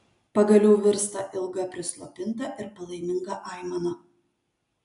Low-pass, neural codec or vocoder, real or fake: 10.8 kHz; none; real